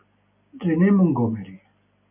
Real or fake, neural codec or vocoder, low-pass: real; none; 3.6 kHz